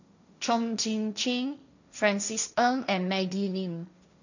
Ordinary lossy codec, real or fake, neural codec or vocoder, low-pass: none; fake; codec, 16 kHz, 1.1 kbps, Voila-Tokenizer; 7.2 kHz